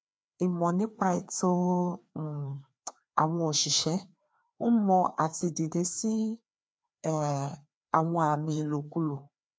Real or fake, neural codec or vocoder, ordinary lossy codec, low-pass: fake; codec, 16 kHz, 2 kbps, FreqCodec, larger model; none; none